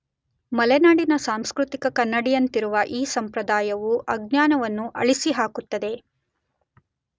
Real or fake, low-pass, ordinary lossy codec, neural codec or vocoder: real; none; none; none